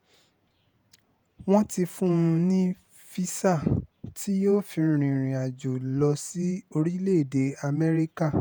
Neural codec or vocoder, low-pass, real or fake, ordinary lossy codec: vocoder, 48 kHz, 128 mel bands, Vocos; none; fake; none